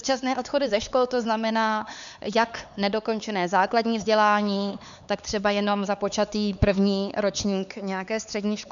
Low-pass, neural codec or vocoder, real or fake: 7.2 kHz; codec, 16 kHz, 4 kbps, X-Codec, HuBERT features, trained on LibriSpeech; fake